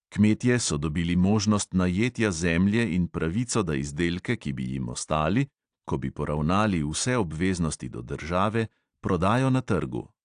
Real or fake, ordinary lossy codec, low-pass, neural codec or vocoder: real; AAC, 64 kbps; 9.9 kHz; none